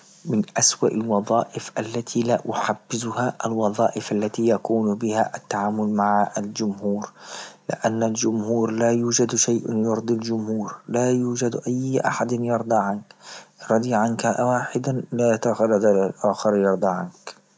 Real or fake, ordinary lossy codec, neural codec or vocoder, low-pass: real; none; none; none